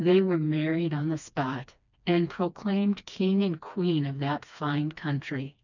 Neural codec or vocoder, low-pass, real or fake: codec, 16 kHz, 2 kbps, FreqCodec, smaller model; 7.2 kHz; fake